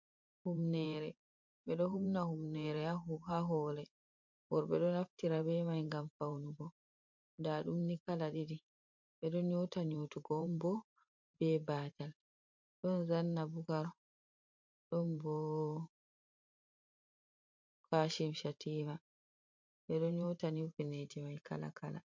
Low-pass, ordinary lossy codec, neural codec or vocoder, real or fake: 7.2 kHz; MP3, 48 kbps; vocoder, 44.1 kHz, 128 mel bands every 512 samples, BigVGAN v2; fake